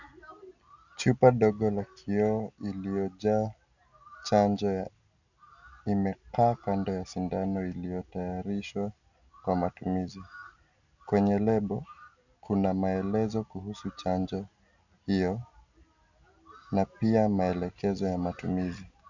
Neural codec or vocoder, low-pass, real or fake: none; 7.2 kHz; real